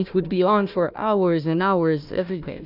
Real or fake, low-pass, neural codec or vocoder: fake; 5.4 kHz; codec, 16 kHz, 1 kbps, FunCodec, trained on Chinese and English, 50 frames a second